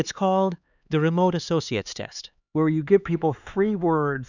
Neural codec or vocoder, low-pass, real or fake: codec, 16 kHz, 4 kbps, X-Codec, HuBERT features, trained on LibriSpeech; 7.2 kHz; fake